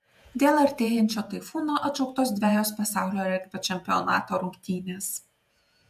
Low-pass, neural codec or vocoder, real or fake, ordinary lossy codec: 14.4 kHz; vocoder, 48 kHz, 128 mel bands, Vocos; fake; MP3, 96 kbps